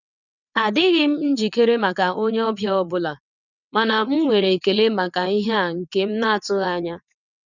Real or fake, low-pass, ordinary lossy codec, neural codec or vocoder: fake; 7.2 kHz; none; vocoder, 22.05 kHz, 80 mel bands, WaveNeXt